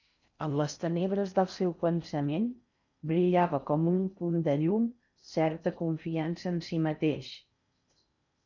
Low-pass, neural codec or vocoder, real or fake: 7.2 kHz; codec, 16 kHz in and 24 kHz out, 0.6 kbps, FocalCodec, streaming, 4096 codes; fake